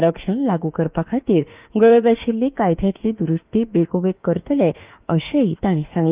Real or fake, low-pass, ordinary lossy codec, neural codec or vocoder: fake; 3.6 kHz; Opus, 24 kbps; autoencoder, 48 kHz, 32 numbers a frame, DAC-VAE, trained on Japanese speech